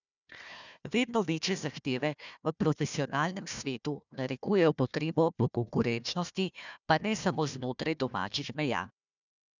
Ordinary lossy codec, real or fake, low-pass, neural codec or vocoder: none; fake; 7.2 kHz; codec, 16 kHz, 1 kbps, FunCodec, trained on Chinese and English, 50 frames a second